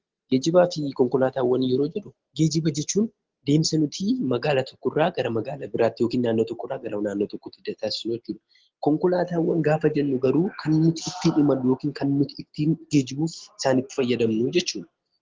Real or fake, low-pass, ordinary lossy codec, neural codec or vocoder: real; 7.2 kHz; Opus, 16 kbps; none